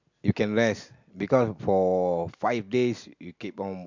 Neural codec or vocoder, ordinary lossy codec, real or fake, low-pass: none; MP3, 64 kbps; real; 7.2 kHz